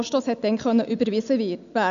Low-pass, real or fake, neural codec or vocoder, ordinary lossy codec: 7.2 kHz; real; none; none